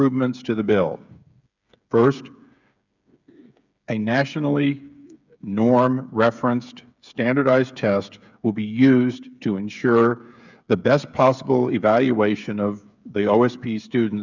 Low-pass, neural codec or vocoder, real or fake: 7.2 kHz; codec, 16 kHz, 8 kbps, FreqCodec, smaller model; fake